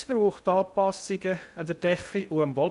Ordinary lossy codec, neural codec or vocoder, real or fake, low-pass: none; codec, 16 kHz in and 24 kHz out, 0.6 kbps, FocalCodec, streaming, 2048 codes; fake; 10.8 kHz